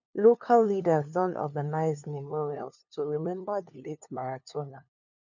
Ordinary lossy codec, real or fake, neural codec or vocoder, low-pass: none; fake; codec, 16 kHz, 2 kbps, FunCodec, trained on LibriTTS, 25 frames a second; 7.2 kHz